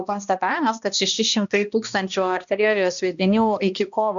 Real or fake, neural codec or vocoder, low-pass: fake; codec, 16 kHz, 1 kbps, X-Codec, HuBERT features, trained on balanced general audio; 7.2 kHz